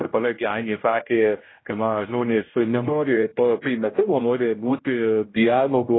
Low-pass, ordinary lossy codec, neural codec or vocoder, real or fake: 7.2 kHz; AAC, 16 kbps; codec, 16 kHz, 0.5 kbps, X-Codec, HuBERT features, trained on general audio; fake